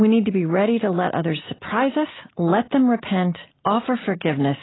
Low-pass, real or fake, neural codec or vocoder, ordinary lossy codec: 7.2 kHz; real; none; AAC, 16 kbps